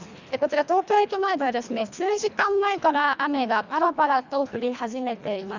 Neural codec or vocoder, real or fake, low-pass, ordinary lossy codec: codec, 24 kHz, 1.5 kbps, HILCodec; fake; 7.2 kHz; none